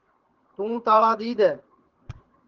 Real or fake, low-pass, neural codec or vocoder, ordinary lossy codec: fake; 7.2 kHz; codec, 24 kHz, 6 kbps, HILCodec; Opus, 16 kbps